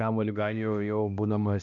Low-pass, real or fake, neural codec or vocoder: 7.2 kHz; fake; codec, 16 kHz, 1 kbps, X-Codec, HuBERT features, trained on balanced general audio